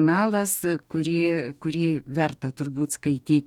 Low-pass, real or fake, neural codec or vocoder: 19.8 kHz; fake; codec, 44.1 kHz, 2.6 kbps, DAC